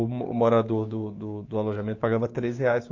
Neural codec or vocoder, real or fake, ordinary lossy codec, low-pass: codec, 16 kHz, 6 kbps, DAC; fake; AAC, 48 kbps; 7.2 kHz